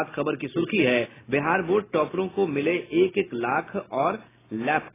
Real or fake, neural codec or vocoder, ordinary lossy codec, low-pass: real; none; AAC, 16 kbps; 3.6 kHz